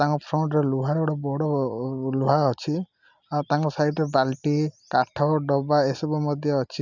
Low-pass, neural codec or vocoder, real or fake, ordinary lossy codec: 7.2 kHz; none; real; none